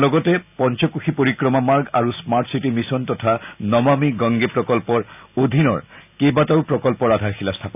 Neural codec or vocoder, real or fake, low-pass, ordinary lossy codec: none; real; 3.6 kHz; none